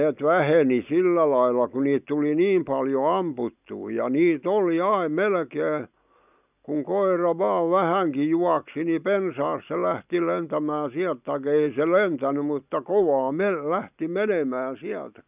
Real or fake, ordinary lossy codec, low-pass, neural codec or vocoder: real; none; 3.6 kHz; none